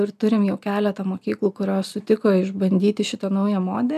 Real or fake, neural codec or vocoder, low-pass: real; none; 14.4 kHz